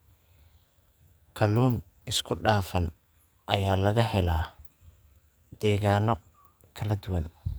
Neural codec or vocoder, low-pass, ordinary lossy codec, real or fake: codec, 44.1 kHz, 2.6 kbps, SNAC; none; none; fake